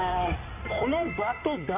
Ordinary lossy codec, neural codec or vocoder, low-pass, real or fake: none; codec, 16 kHz in and 24 kHz out, 2.2 kbps, FireRedTTS-2 codec; 3.6 kHz; fake